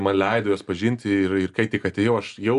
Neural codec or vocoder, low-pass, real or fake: none; 10.8 kHz; real